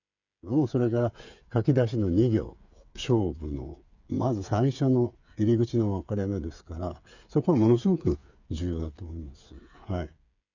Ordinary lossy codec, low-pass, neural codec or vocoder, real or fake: none; 7.2 kHz; codec, 16 kHz, 8 kbps, FreqCodec, smaller model; fake